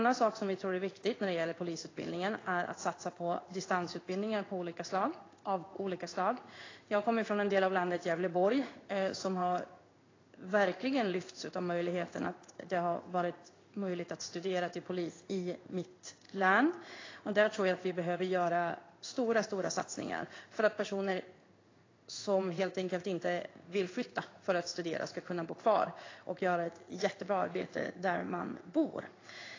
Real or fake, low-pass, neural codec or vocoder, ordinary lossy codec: fake; 7.2 kHz; codec, 16 kHz in and 24 kHz out, 1 kbps, XY-Tokenizer; AAC, 32 kbps